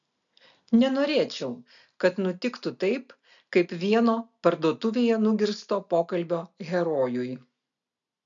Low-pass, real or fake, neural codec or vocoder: 7.2 kHz; real; none